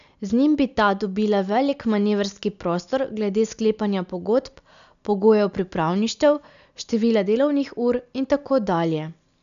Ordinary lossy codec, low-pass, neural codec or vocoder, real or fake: none; 7.2 kHz; none; real